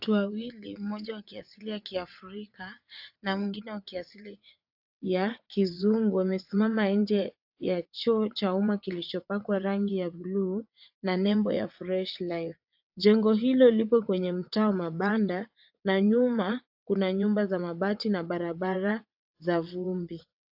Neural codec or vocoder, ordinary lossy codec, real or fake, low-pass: vocoder, 22.05 kHz, 80 mel bands, WaveNeXt; AAC, 48 kbps; fake; 5.4 kHz